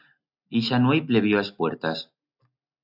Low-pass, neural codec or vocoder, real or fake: 5.4 kHz; none; real